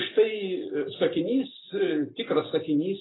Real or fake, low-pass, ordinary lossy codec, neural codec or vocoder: real; 7.2 kHz; AAC, 16 kbps; none